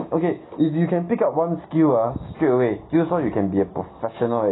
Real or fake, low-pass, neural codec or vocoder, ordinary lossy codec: real; 7.2 kHz; none; AAC, 16 kbps